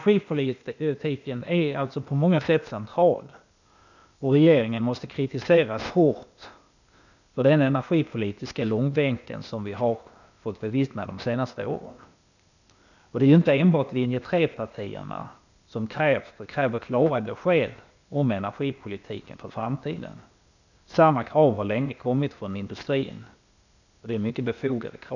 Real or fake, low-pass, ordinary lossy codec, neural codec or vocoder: fake; 7.2 kHz; none; codec, 16 kHz, 0.8 kbps, ZipCodec